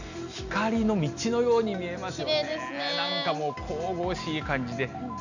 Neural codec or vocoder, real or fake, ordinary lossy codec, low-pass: none; real; none; 7.2 kHz